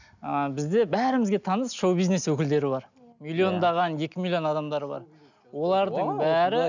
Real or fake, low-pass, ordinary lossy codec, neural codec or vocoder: real; 7.2 kHz; none; none